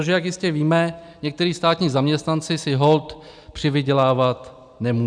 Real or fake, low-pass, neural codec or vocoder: real; 9.9 kHz; none